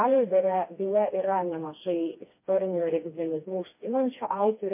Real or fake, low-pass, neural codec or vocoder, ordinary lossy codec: fake; 3.6 kHz; codec, 16 kHz, 2 kbps, FreqCodec, smaller model; MP3, 24 kbps